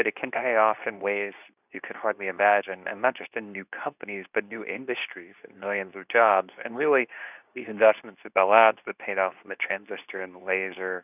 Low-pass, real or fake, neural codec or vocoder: 3.6 kHz; fake; codec, 24 kHz, 0.9 kbps, WavTokenizer, medium speech release version 2